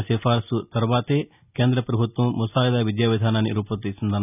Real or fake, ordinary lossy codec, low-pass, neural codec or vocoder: real; none; 3.6 kHz; none